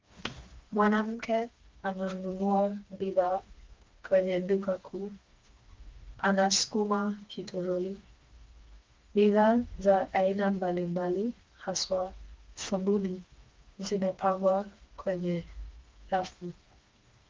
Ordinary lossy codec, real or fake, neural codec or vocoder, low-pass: Opus, 32 kbps; fake; codec, 16 kHz, 2 kbps, FreqCodec, smaller model; 7.2 kHz